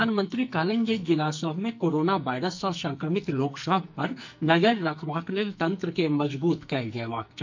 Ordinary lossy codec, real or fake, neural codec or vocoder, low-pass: MP3, 64 kbps; fake; codec, 44.1 kHz, 2.6 kbps, SNAC; 7.2 kHz